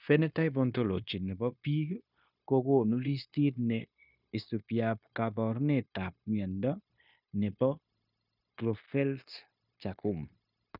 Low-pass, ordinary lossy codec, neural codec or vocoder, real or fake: 5.4 kHz; none; codec, 16 kHz, 0.9 kbps, LongCat-Audio-Codec; fake